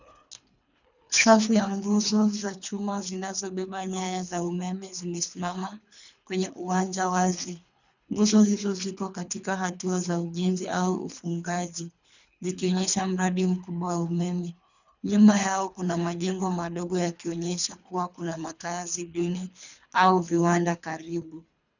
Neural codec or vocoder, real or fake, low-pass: codec, 24 kHz, 3 kbps, HILCodec; fake; 7.2 kHz